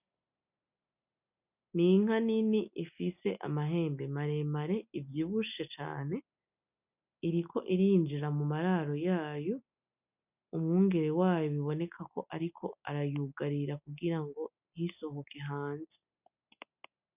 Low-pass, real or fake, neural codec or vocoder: 3.6 kHz; real; none